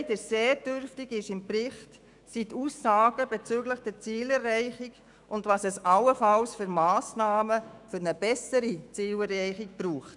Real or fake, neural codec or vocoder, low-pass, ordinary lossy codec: fake; autoencoder, 48 kHz, 128 numbers a frame, DAC-VAE, trained on Japanese speech; 10.8 kHz; none